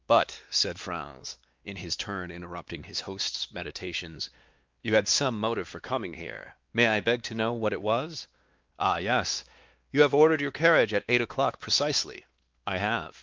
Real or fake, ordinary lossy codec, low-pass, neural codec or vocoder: fake; Opus, 24 kbps; 7.2 kHz; codec, 16 kHz, 2 kbps, X-Codec, WavLM features, trained on Multilingual LibriSpeech